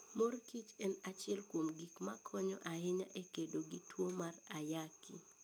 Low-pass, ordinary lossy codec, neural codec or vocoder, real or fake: none; none; none; real